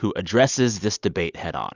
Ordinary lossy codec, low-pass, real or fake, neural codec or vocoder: Opus, 64 kbps; 7.2 kHz; real; none